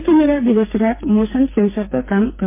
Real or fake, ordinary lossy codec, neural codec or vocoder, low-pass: fake; MP3, 24 kbps; codec, 44.1 kHz, 2.6 kbps, SNAC; 3.6 kHz